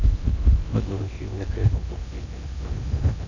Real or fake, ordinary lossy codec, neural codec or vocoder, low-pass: fake; none; codec, 16 kHz in and 24 kHz out, 0.6 kbps, FocalCodec, streaming, 4096 codes; 7.2 kHz